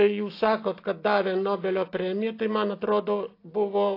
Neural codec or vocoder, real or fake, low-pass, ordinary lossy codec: none; real; 5.4 kHz; AAC, 32 kbps